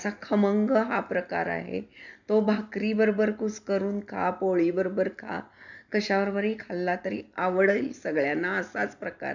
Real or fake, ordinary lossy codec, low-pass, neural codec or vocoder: real; none; 7.2 kHz; none